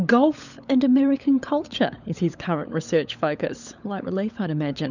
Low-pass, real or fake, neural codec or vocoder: 7.2 kHz; fake; codec, 16 kHz, 16 kbps, FunCodec, trained on LibriTTS, 50 frames a second